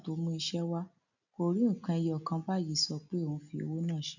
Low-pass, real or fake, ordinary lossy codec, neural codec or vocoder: 7.2 kHz; real; none; none